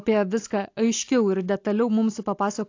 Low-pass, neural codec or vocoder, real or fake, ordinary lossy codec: 7.2 kHz; none; real; AAC, 48 kbps